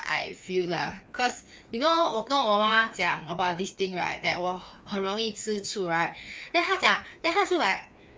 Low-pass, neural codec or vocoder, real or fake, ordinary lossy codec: none; codec, 16 kHz, 2 kbps, FreqCodec, larger model; fake; none